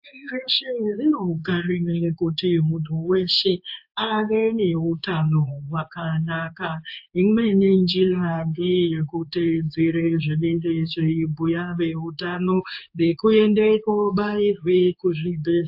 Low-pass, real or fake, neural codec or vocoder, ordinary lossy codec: 5.4 kHz; fake; codec, 16 kHz in and 24 kHz out, 1 kbps, XY-Tokenizer; AAC, 48 kbps